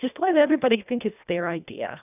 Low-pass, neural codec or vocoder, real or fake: 3.6 kHz; codec, 24 kHz, 1.5 kbps, HILCodec; fake